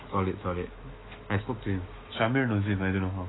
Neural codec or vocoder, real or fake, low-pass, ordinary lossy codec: autoencoder, 48 kHz, 128 numbers a frame, DAC-VAE, trained on Japanese speech; fake; 7.2 kHz; AAC, 16 kbps